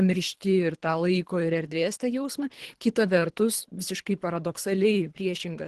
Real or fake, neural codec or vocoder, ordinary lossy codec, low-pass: fake; codec, 24 kHz, 3 kbps, HILCodec; Opus, 16 kbps; 10.8 kHz